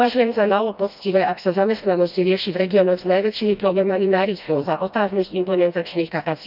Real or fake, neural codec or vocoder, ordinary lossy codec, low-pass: fake; codec, 16 kHz, 1 kbps, FreqCodec, smaller model; none; 5.4 kHz